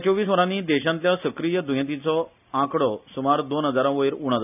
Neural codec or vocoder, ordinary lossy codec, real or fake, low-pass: none; none; real; 3.6 kHz